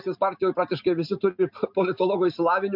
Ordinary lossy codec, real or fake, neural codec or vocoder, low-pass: MP3, 48 kbps; real; none; 5.4 kHz